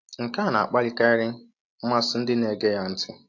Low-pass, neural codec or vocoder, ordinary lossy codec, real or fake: 7.2 kHz; none; AAC, 48 kbps; real